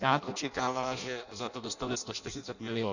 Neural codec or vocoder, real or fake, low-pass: codec, 16 kHz in and 24 kHz out, 0.6 kbps, FireRedTTS-2 codec; fake; 7.2 kHz